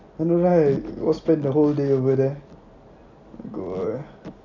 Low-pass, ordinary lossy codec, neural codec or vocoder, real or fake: 7.2 kHz; none; none; real